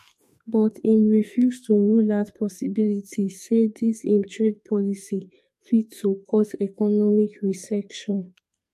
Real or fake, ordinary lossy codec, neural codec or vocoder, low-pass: fake; MP3, 64 kbps; codec, 44.1 kHz, 2.6 kbps, SNAC; 14.4 kHz